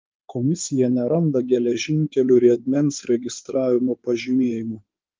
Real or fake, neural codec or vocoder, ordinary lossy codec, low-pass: fake; codec, 16 kHz in and 24 kHz out, 2.2 kbps, FireRedTTS-2 codec; Opus, 32 kbps; 7.2 kHz